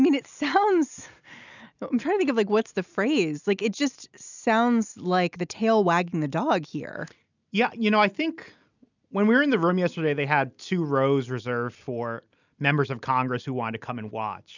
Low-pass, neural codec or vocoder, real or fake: 7.2 kHz; none; real